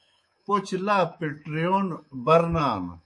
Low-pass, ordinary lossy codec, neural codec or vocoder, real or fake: 10.8 kHz; MP3, 48 kbps; codec, 24 kHz, 3.1 kbps, DualCodec; fake